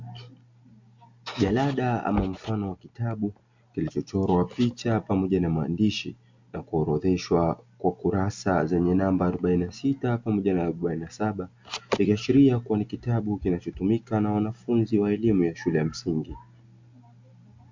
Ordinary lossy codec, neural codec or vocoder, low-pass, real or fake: MP3, 64 kbps; none; 7.2 kHz; real